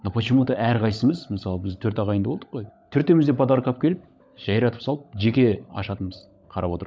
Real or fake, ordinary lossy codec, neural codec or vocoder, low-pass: fake; none; codec, 16 kHz, 16 kbps, FunCodec, trained on LibriTTS, 50 frames a second; none